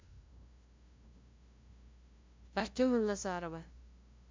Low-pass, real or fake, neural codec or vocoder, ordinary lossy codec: 7.2 kHz; fake; codec, 16 kHz, 0.5 kbps, FunCodec, trained on LibriTTS, 25 frames a second; MP3, 64 kbps